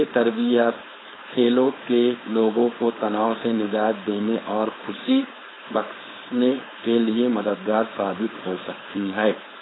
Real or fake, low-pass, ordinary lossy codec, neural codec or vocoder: fake; 7.2 kHz; AAC, 16 kbps; codec, 16 kHz, 4.8 kbps, FACodec